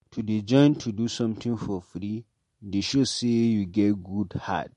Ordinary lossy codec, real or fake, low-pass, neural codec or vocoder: MP3, 48 kbps; real; 14.4 kHz; none